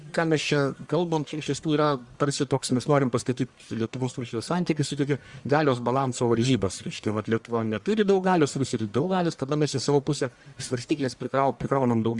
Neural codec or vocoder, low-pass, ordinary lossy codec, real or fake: codec, 44.1 kHz, 1.7 kbps, Pupu-Codec; 10.8 kHz; Opus, 64 kbps; fake